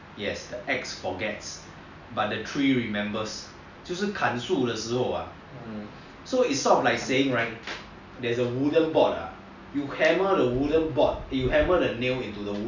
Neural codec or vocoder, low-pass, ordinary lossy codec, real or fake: none; 7.2 kHz; none; real